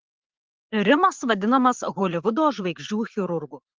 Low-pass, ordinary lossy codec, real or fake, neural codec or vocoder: 7.2 kHz; Opus, 16 kbps; fake; vocoder, 44.1 kHz, 80 mel bands, Vocos